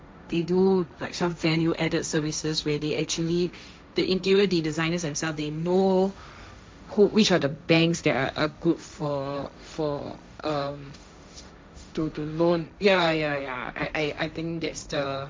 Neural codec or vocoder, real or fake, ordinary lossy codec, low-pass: codec, 16 kHz, 1.1 kbps, Voila-Tokenizer; fake; none; none